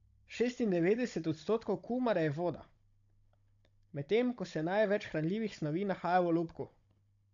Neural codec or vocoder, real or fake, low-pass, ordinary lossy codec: codec, 16 kHz, 16 kbps, FunCodec, trained on Chinese and English, 50 frames a second; fake; 7.2 kHz; AAC, 64 kbps